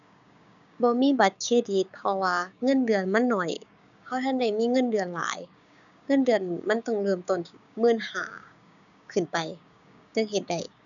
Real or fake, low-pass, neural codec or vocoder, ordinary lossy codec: fake; 7.2 kHz; codec, 16 kHz, 6 kbps, DAC; none